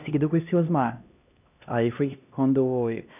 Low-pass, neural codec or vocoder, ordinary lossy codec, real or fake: 3.6 kHz; codec, 16 kHz, 1 kbps, X-Codec, HuBERT features, trained on LibriSpeech; none; fake